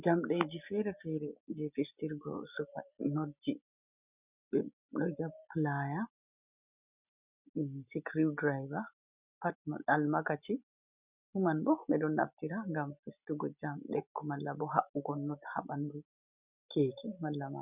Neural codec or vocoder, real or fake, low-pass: none; real; 3.6 kHz